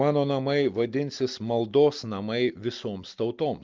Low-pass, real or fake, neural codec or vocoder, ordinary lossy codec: 7.2 kHz; real; none; Opus, 24 kbps